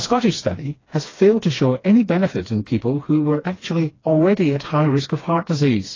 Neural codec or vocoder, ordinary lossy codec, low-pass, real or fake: codec, 16 kHz, 2 kbps, FreqCodec, smaller model; AAC, 32 kbps; 7.2 kHz; fake